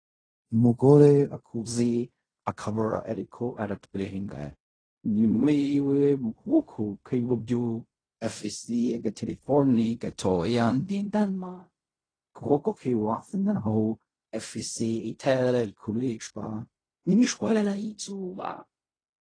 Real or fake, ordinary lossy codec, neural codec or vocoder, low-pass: fake; AAC, 32 kbps; codec, 16 kHz in and 24 kHz out, 0.4 kbps, LongCat-Audio-Codec, fine tuned four codebook decoder; 9.9 kHz